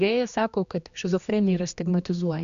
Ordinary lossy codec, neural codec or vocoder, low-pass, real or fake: Opus, 64 kbps; codec, 16 kHz, 2 kbps, X-Codec, HuBERT features, trained on general audio; 7.2 kHz; fake